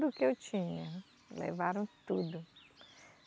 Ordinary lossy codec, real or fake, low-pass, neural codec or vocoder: none; real; none; none